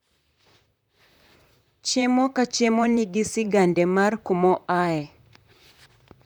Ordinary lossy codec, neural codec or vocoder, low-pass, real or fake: none; vocoder, 44.1 kHz, 128 mel bands, Pupu-Vocoder; 19.8 kHz; fake